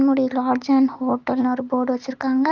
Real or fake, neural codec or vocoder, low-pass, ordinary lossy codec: real; none; 7.2 kHz; Opus, 32 kbps